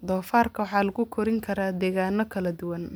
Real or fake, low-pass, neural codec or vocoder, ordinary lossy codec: real; none; none; none